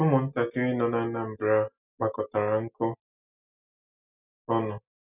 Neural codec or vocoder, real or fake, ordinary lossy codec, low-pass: none; real; none; 3.6 kHz